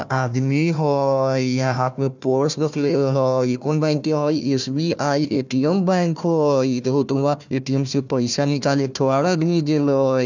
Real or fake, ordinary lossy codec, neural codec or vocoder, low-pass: fake; none; codec, 16 kHz, 1 kbps, FunCodec, trained on Chinese and English, 50 frames a second; 7.2 kHz